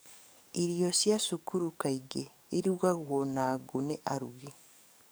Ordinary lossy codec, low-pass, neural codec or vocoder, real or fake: none; none; codec, 44.1 kHz, 7.8 kbps, DAC; fake